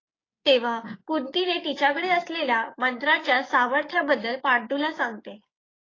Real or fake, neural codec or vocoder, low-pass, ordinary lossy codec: fake; codec, 16 kHz, 6 kbps, DAC; 7.2 kHz; AAC, 32 kbps